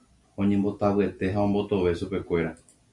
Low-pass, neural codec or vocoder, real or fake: 10.8 kHz; none; real